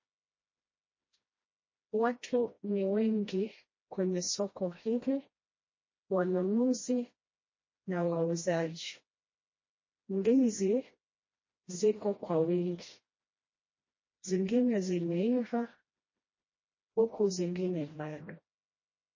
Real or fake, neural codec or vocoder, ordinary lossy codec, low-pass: fake; codec, 16 kHz, 1 kbps, FreqCodec, smaller model; MP3, 32 kbps; 7.2 kHz